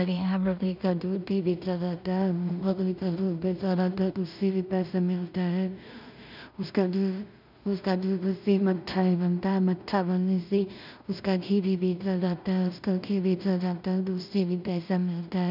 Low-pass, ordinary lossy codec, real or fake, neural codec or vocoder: 5.4 kHz; none; fake; codec, 16 kHz in and 24 kHz out, 0.4 kbps, LongCat-Audio-Codec, two codebook decoder